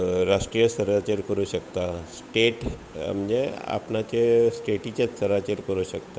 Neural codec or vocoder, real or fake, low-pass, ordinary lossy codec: codec, 16 kHz, 8 kbps, FunCodec, trained on Chinese and English, 25 frames a second; fake; none; none